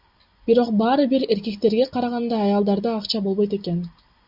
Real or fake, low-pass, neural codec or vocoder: real; 5.4 kHz; none